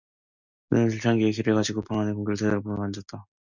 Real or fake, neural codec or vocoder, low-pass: real; none; 7.2 kHz